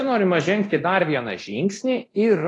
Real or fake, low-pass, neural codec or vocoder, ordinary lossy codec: fake; 10.8 kHz; codec, 24 kHz, 0.9 kbps, DualCodec; AAC, 32 kbps